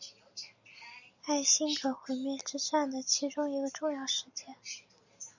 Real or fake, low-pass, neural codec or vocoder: real; 7.2 kHz; none